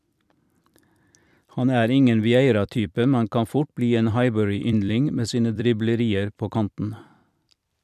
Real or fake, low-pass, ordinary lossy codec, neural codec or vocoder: fake; 14.4 kHz; none; vocoder, 44.1 kHz, 128 mel bands every 256 samples, BigVGAN v2